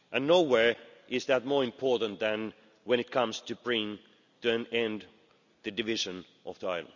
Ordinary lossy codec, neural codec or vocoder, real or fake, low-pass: none; none; real; 7.2 kHz